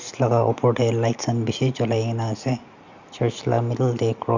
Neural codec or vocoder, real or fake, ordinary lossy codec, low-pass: none; real; Opus, 64 kbps; 7.2 kHz